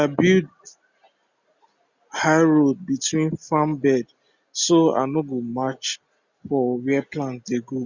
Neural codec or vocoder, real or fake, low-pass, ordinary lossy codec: none; real; 7.2 kHz; Opus, 64 kbps